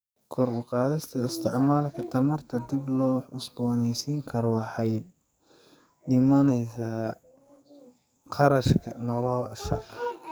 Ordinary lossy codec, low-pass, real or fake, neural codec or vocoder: none; none; fake; codec, 44.1 kHz, 2.6 kbps, SNAC